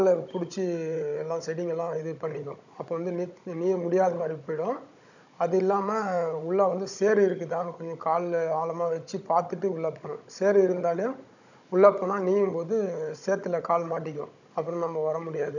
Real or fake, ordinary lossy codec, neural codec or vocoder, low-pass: fake; none; codec, 16 kHz, 16 kbps, FunCodec, trained on Chinese and English, 50 frames a second; 7.2 kHz